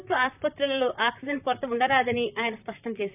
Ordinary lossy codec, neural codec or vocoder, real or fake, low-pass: none; vocoder, 44.1 kHz, 128 mel bands, Pupu-Vocoder; fake; 3.6 kHz